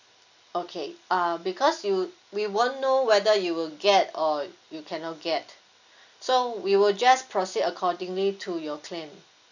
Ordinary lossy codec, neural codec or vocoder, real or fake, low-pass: none; none; real; 7.2 kHz